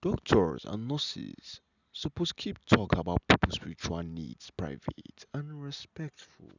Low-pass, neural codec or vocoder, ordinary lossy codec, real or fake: 7.2 kHz; none; none; real